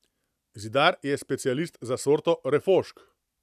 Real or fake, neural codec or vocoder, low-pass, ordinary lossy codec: fake; vocoder, 44.1 kHz, 128 mel bands every 256 samples, BigVGAN v2; 14.4 kHz; none